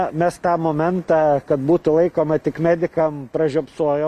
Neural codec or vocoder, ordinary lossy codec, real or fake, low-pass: none; MP3, 96 kbps; real; 14.4 kHz